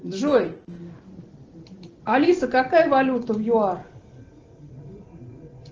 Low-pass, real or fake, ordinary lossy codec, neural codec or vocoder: 7.2 kHz; real; Opus, 16 kbps; none